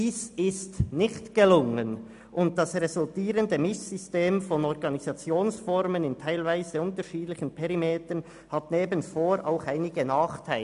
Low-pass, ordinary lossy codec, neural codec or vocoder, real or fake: 10.8 kHz; AAC, 96 kbps; none; real